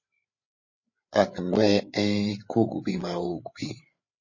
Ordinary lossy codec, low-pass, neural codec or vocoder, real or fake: MP3, 32 kbps; 7.2 kHz; codec, 16 kHz, 8 kbps, FreqCodec, larger model; fake